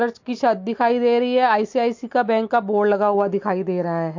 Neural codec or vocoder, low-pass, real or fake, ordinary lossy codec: none; 7.2 kHz; real; MP3, 48 kbps